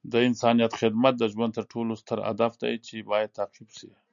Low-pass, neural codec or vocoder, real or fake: 7.2 kHz; none; real